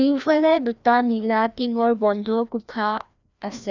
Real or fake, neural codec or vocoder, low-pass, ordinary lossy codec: fake; codec, 16 kHz, 1 kbps, FreqCodec, larger model; 7.2 kHz; none